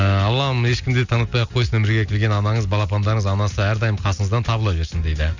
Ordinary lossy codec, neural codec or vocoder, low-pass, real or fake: none; none; 7.2 kHz; real